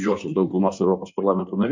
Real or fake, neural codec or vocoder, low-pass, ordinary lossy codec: fake; codec, 16 kHz in and 24 kHz out, 1.1 kbps, FireRedTTS-2 codec; 7.2 kHz; MP3, 64 kbps